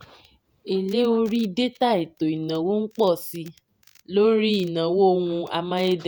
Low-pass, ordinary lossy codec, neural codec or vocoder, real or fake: none; none; vocoder, 48 kHz, 128 mel bands, Vocos; fake